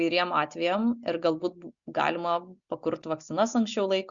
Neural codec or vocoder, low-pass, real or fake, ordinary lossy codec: none; 7.2 kHz; real; Opus, 64 kbps